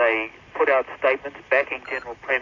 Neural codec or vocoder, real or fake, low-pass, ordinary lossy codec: none; real; 7.2 kHz; AAC, 32 kbps